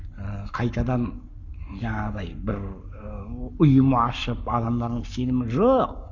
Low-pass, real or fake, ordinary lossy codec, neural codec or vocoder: 7.2 kHz; fake; none; codec, 44.1 kHz, 7.8 kbps, Pupu-Codec